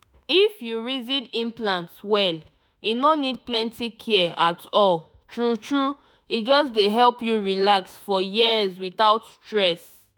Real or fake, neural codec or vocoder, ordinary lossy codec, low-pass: fake; autoencoder, 48 kHz, 32 numbers a frame, DAC-VAE, trained on Japanese speech; none; none